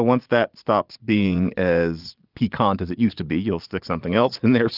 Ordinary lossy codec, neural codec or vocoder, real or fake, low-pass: Opus, 32 kbps; vocoder, 22.05 kHz, 80 mel bands, Vocos; fake; 5.4 kHz